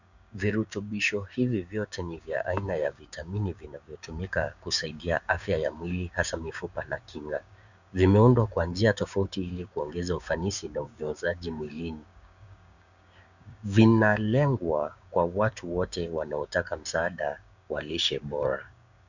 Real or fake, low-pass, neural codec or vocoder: fake; 7.2 kHz; codec, 16 kHz, 6 kbps, DAC